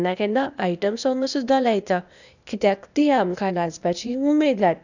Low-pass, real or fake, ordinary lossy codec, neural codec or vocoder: 7.2 kHz; fake; none; codec, 16 kHz, 0.8 kbps, ZipCodec